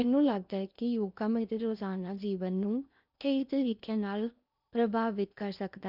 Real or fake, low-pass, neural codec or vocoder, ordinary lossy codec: fake; 5.4 kHz; codec, 16 kHz in and 24 kHz out, 0.8 kbps, FocalCodec, streaming, 65536 codes; none